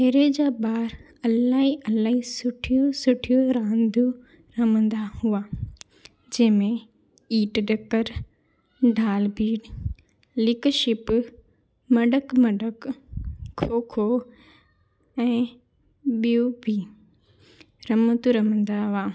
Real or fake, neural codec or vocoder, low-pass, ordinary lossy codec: real; none; none; none